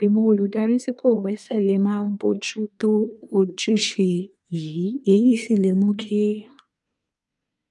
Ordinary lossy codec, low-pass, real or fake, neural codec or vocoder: none; 10.8 kHz; fake; codec, 24 kHz, 1 kbps, SNAC